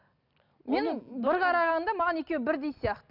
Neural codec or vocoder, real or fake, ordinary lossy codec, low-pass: none; real; none; 5.4 kHz